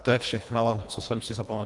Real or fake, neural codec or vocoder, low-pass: fake; codec, 24 kHz, 1.5 kbps, HILCodec; 10.8 kHz